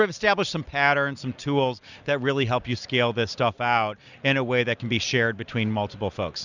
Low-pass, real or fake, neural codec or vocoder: 7.2 kHz; real; none